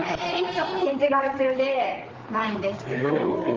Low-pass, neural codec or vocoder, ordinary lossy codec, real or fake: 7.2 kHz; codec, 16 kHz, 4 kbps, FreqCodec, larger model; Opus, 24 kbps; fake